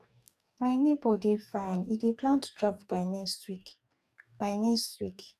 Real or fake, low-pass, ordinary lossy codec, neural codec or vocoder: fake; 14.4 kHz; none; codec, 44.1 kHz, 2.6 kbps, DAC